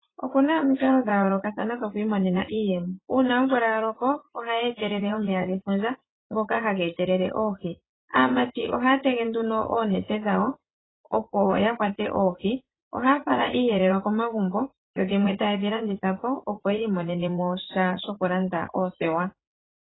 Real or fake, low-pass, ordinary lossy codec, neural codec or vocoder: fake; 7.2 kHz; AAC, 16 kbps; vocoder, 44.1 kHz, 80 mel bands, Vocos